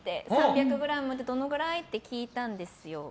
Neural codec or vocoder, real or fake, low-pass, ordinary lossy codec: none; real; none; none